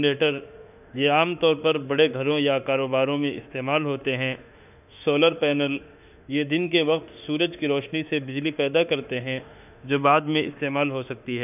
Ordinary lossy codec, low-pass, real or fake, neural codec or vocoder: none; 3.6 kHz; fake; autoencoder, 48 kHz, 32 numbers a frame, DAC-VAE, trained on Japanese speech